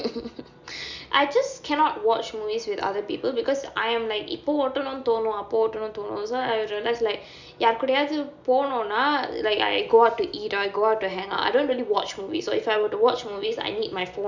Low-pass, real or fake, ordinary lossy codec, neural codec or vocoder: 7.2 kHz; real; none; none